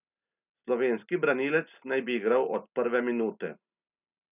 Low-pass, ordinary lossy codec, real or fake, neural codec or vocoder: 3.6 kHz; none; real; none